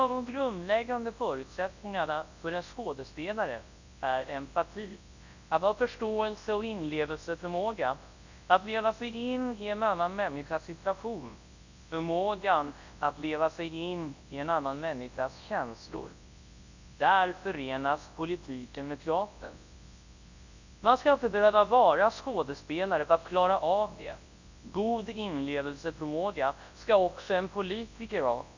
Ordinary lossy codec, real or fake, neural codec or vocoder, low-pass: Opus, 64 kbps; fake; codec, 24 kHz, 0.9 kbps, WavTokenizer, large speech release; 7.2 kHz